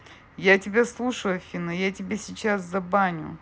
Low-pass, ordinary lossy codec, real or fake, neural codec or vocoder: none; none; real; none